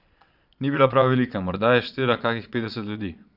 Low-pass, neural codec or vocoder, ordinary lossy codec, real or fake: 5.4 kHz; vocoder, 22.05 kHz, 80 mel bands, Vocos; none; fake